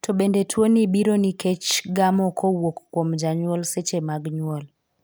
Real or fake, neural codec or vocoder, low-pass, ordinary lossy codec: real; none; none; none